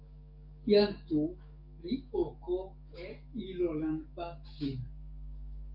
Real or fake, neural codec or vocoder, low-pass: fake; codec, 44.1 kHz, 7.8 kbps, DAC; 5.4 kHz